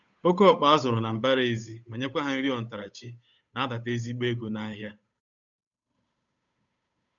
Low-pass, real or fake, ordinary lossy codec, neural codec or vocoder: 7.2 kHz; fake; none; codec, 16 kHz, 8 kbps, FunCodec, trained on Chinese and English, 25 frames a second